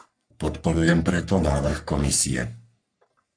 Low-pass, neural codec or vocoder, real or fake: 9.9 kHz; codec, 44.1 kHz, 3.4 kbps, Pupu-Codec; fake